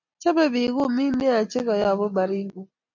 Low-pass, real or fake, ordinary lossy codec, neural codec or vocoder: 7.2 kHz; real; MP3, 64 kbps; none